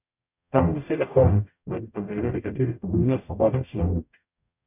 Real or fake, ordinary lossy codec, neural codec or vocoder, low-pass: fake; none; codec, 44.1 kHz, 0.9 kbps, DAC; 3.6 kHz